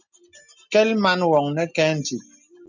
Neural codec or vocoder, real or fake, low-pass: none; real; 7.2 kHz